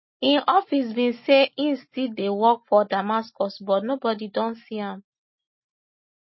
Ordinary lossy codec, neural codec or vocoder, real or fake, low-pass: MP3, 24 kbps; none; real; 7.2 kHz